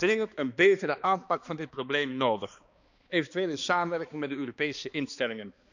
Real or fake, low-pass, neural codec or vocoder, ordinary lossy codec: fake; 7.2 kHz; codec, 16 kHz, 2 kbps, X-Codec, HuBERT features, trained on balanced general audio; none